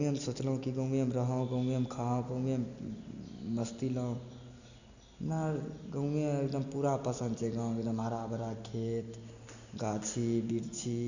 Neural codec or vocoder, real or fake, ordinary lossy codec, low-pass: none; real; none; 7.2 kHz